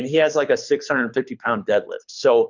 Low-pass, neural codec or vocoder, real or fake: 7.2 kHz; codec, 24 kHz, 6 kbps, HILCodec; fake